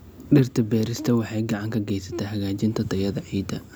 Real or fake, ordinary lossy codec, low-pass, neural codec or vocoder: real; none; none; none